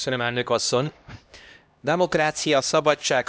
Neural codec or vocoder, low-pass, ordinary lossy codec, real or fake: codec, 16 kHz, 1 kbps, X-Codec, HuBERT features, trained on LibriSpeech; none; none; fake